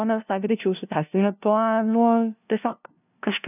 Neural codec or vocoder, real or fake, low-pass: codec, 16 kHz, 0.5 kbps, FunCodec, trained on LibriTTS, 25 frames a second; fake; 3.6 kHz